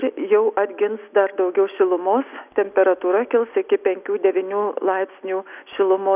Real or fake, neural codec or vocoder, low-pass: real; none; 3.6 kHz